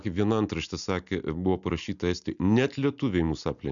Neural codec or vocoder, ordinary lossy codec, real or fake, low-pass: none; MP3, 96 kbps; real; 7.2 kHz